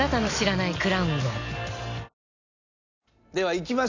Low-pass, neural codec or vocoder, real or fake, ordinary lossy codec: 7.2 kHz; none; real; none